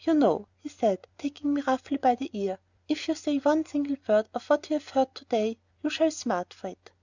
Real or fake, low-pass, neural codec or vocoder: real; 7.2 kHz; none